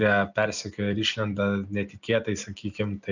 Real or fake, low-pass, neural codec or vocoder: real; 7.2 kHz; none